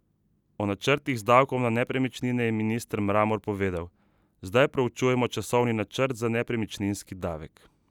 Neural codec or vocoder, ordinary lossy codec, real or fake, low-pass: none; none; real; 19.8 kHz